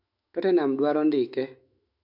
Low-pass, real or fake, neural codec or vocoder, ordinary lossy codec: 5.4 kHz; fake; autoencoder, 48 kHz, 128 numbers a frame, DAC-VAE, trained on Japanese speech; none